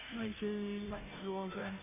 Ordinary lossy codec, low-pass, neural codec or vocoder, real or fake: AAC, 32 kbps; 3.6 kHz; codec, 16 kHz, 0.5 kbps, FunCodec, trained on Chinese and English, 25 frames a second; fake